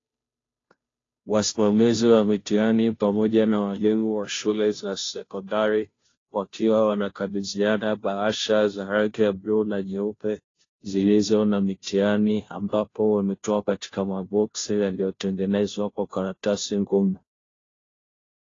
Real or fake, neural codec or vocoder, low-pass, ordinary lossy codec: fake; codec, 16 kHz, 0.5 kbps, FunCodec, trained on Chinese and English, 25 frames a second; 7.2 kHz; AAC, 32 kbps